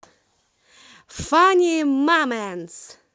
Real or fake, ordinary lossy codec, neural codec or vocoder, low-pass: real; none; none; none